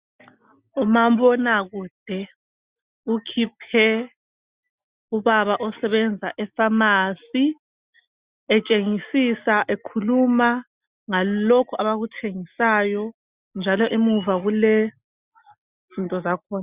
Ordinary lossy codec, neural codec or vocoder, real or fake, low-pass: Opus, 64 kbps; none; real; 3.6 kHz